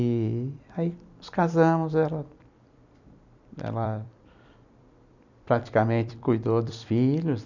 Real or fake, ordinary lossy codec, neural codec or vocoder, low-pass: fake; none; vocoder, 44.1 kHz, 128 mel bands every 256 samples, BigVGAN v2; 7.2 kHz